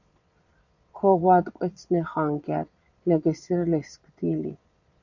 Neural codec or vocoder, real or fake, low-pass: vocoder, 22.05 kHz, 80 mel bands, Vocos; fake; 7.2 kHz